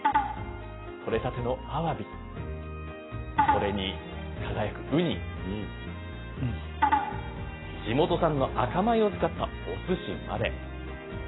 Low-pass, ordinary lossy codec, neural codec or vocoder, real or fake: 7.2 kHz; AAC, 16 kbps; none; real